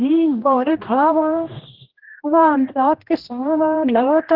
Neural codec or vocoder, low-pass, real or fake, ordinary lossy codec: codec, 16 kHz, 1 kbps, X-Codec, HuBERT features, trained on general audio; 5.4 kHz; fake; Opus, 16 kbps